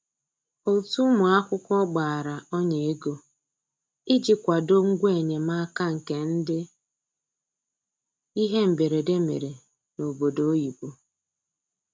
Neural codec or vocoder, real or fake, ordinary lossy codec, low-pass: none; real; none; none